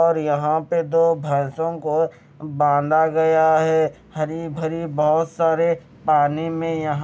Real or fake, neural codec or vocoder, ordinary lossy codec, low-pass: real; none; none; none